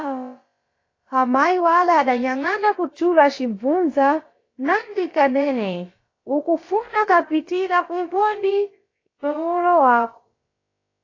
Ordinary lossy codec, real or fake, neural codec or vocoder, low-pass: AAC, 32 kbps; fake; codec, 16 kHz, about 1 kbps, DyCAST, with the encoder's durations; 7.2 kHz